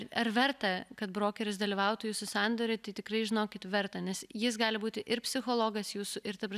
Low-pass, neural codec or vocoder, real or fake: 14.4 kHz; none; real